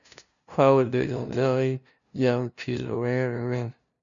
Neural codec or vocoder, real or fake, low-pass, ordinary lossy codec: codec, 16 kHz, 0.5 kbps, FunCodec, trained on LibriTTS, 25 frames a second; fake; 7.2 kHz; none